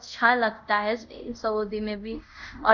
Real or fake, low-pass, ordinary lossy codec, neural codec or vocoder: fake; 7.2 kHz; none; codec, 24 kHz, 0.5 kbps, DualCodec